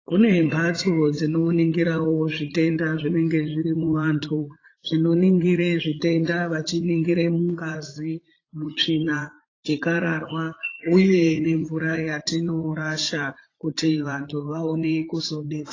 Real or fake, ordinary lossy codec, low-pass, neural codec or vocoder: fake; AAC, 32 kbps; 7.2 kHz; vocoder, 44.1 kHz, 80 mel bands, Vocos